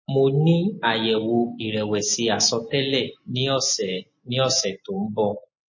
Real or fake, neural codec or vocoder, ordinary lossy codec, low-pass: real; none; MP3, 32 kbps; 7.2 kHz